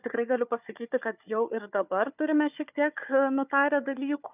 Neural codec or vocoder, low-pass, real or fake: codec, 16 kHz, 4 kbps, FunCodec, trained on Chinese and English, 50 frames a second; 3.6 kHz; fake